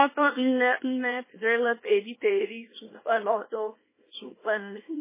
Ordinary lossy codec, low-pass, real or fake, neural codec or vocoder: MP3, 16 kbps; 3.6 kHz; fake; codec, 24 kHz, 0.9 kbps, WavTokenizer, small release